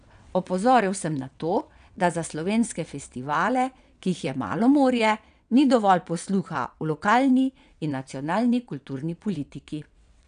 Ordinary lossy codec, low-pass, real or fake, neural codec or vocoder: none; 9.9 kHz; fake; vocoder, 22.05 kHz, 80 mel bands, WaveNeXt